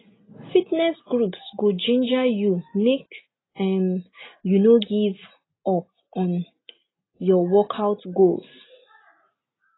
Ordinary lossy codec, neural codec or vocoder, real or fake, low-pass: AAC, 16 kbps; none; real; 7.2 kHz